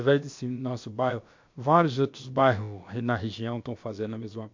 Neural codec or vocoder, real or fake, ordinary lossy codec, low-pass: codec, 16 kHz, about 1 kbps, DyCAST, with the encoder's durations; fake; MP3, 48 kbps; 7.2 kHz